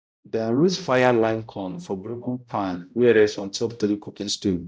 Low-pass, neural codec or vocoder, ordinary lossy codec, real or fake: none; codec, 16 kHz, 0.5 kbps, X-Codec, HuBERT features, trained on balanced general audio; none; fake